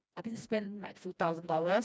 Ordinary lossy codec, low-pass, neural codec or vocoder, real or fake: none; none; codec, 16 kHz, 1 kbps, FreqCodec, smaller model; fake